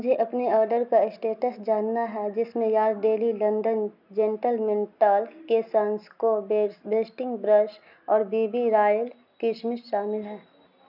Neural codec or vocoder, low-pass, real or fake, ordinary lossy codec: none; 5.4 kHz; real; none